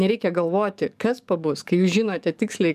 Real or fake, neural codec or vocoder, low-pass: fake; codec, 44.1 kHz, 7.8 kbps, DAC; 14.4 kHz